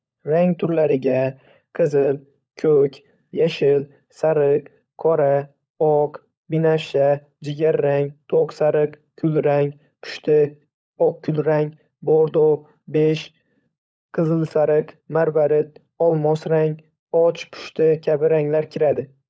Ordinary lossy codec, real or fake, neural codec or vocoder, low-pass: none; fake; codec, 16 kHz, 16 kbps, FunCodec, trained on LibriTTS, 50 frames a second; none